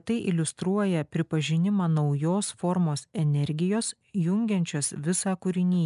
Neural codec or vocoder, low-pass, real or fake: none; 10.8 kHz; real